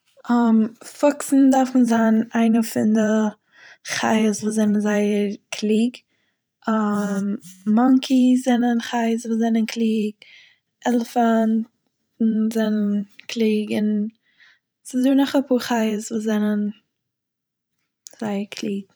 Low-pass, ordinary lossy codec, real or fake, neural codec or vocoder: none; none; fake; vocoder, 44.1 kHz, 128 mel bands every 512 samples, BigVGAN v2